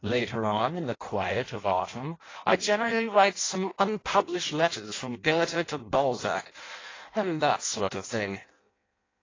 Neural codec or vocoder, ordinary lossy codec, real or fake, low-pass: codec, 16 kHz in and 24 kHz out, 0.6 kbps, FireRedTTS-2 codec; AAC, 32 kbps; fake; 7.2 kHz